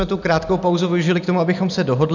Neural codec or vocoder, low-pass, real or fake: none; 7.2 kHz; real